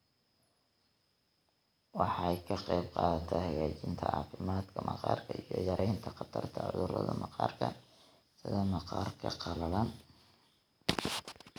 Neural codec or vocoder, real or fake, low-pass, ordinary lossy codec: none; real; none; none